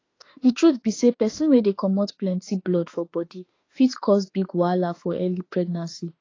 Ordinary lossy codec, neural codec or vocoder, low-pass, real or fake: AAC, 32 kbps; autoencoder, 48 kHz, 32 numbers a frame, DAC-VAE, trained on Japanese speech; 7.2 kHz; fake